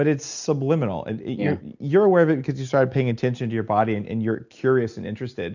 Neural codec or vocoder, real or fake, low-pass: none; real; 7.2 kHz